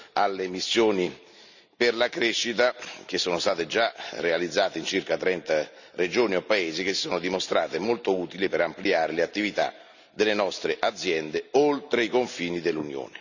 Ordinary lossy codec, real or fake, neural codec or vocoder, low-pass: none; real; none; 7.2 kHz